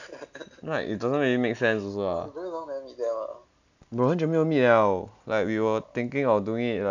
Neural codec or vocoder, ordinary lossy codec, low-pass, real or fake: none; none; 7.2 kHz; real